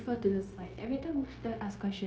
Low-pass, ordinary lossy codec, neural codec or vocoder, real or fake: none; none; codec, 16 kHz, 0.9 kbps, LongCat-Audio-Codec; fake